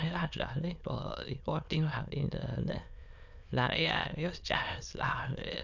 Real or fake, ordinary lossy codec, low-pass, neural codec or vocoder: fake; none; 7.2 kHz; autoencoder, 22.05 kHz, a latent of 192 numbers a frame, VITS, trained on many speakers